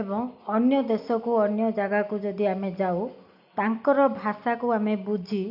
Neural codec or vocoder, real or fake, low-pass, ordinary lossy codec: none; real; 5.4 kHz; none